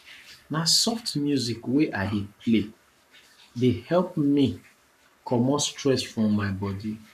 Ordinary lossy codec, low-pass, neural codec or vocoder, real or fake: none; 14.4 kHz; codec, 44.1 kHz, 7.8 kbps, Pupu-Codec; fake